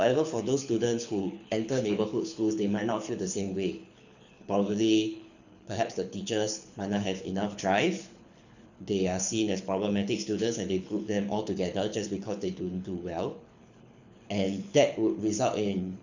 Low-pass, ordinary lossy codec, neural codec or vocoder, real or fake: 7.2 kHz; none; codec, 24 kHz, 6 kbps, HILCodec; fake